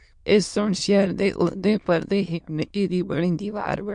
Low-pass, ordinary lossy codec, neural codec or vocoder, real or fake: 9.9 kHz; MP3, 64 kbps; autoencoder, 22.05 kHz, a latent of 192 numbers a frame, VITS, trained on many speakers; fake